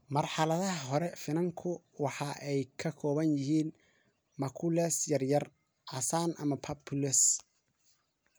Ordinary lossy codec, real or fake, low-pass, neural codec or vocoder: none; real; none; none